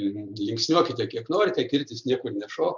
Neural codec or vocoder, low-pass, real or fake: none; 7.2 kHz; real